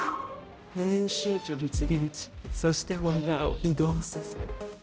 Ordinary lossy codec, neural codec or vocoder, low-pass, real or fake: none; codec, 16 kHz, 0.5 kbps, X-Codec, HuBERT features, trained on balanced general audio; none; fake